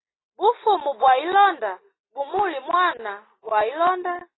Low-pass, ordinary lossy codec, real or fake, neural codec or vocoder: 7.2 kHz; AAC, 16 kbps; real; none